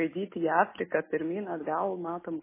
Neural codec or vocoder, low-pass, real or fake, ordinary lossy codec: none; 3.6 kHz; real; MP3, 16 kbps